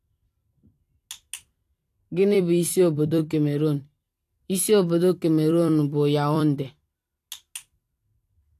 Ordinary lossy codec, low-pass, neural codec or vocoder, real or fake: AAC, 64 kbps; 14.4 kHz; vocoder, 44.1 kHz, 128 mel bands every 256 samples, BigVGAN v2; fake